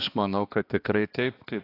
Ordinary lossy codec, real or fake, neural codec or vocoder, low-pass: AAC, 32 kbps; fake; codec, 16 kHz, 2 kbps, X-Codec, HuBERT features, trained on balanced general audio; 5.4 kHz